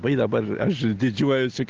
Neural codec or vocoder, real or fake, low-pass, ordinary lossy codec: none; real; 7.2 kHz; Opus, 16 kbps